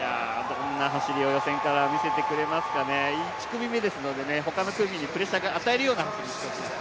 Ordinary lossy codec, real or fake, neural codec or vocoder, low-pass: none; real; none; none